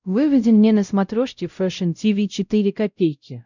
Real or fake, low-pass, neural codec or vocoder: fake; 7.2 kHz; codec, 16 kHz, 0.5 kbps, X-Codec, WavLM features, trained on Multilingual LibriSpeech